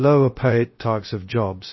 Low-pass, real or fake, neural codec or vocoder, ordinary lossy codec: 7.2 kHz; fake; codec, 16 kHz, 0.2 kbps, FocalCodec; MP3, 24 kbps